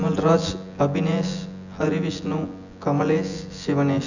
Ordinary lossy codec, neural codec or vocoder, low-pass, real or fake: none; vocoder, 24 kHz, 100 mel bands, Vocos; 7.2 kHz; fake